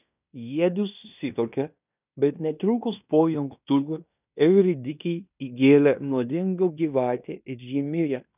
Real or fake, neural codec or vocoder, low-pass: fake; codec, 16 kHz in and 24 kHz out, 0.9 kbps, LongCat-Audio-Codec, fine tuned four codebook decoder; 3.6 kHz